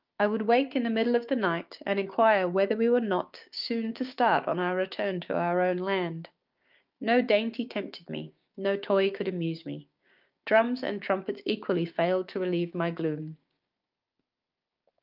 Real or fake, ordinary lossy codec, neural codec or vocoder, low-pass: fake; Opus, 24 kbps; codec, 16 kHz, 6 kbps, DAC; 5.4 kHz